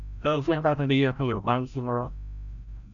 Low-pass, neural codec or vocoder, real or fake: 7.2 kHz; codec, 16 kHz, 0.5 kbps, FreqCodec, larger model; fake